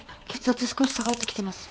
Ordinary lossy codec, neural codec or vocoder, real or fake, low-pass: none; codec, 16 kHz, 4 kbps, X-Codec, HuBERT features, trained on balanced general audio; fake; none